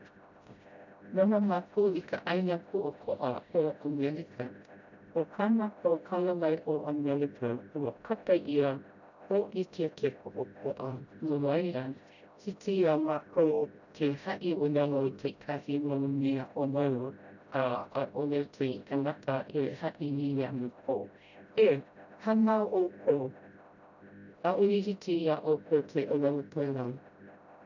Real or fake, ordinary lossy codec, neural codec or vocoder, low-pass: fake; none; codec, 16 kHz, 0.5 kbps, FreqCodec, smaller model; 7.2 kHz